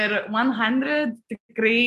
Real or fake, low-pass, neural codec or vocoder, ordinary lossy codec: real; 14.4 kHz; none; AAC, 64 kbps